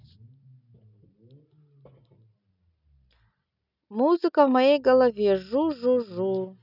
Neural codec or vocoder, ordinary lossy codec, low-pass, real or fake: none; none; 5.4 kHz; real